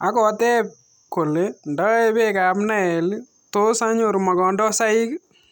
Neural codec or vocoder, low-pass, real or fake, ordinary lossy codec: none; 19.8 kHz; real; none